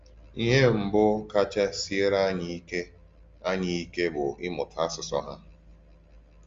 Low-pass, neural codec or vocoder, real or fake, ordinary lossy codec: 7.2 kHz; none; real; Opus, 32 kbps